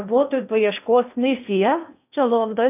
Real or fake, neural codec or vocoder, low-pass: fake; codec, 16 kHz in and 24 kHz out, 0.6 kbps, FocalCodec, streaming, 4096 codes; 3.6 kHz